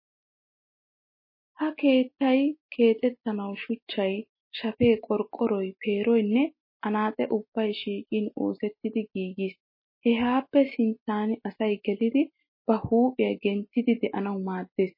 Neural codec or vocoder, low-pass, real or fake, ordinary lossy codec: none; 5.4 kHz; real; MP3, 24 kbps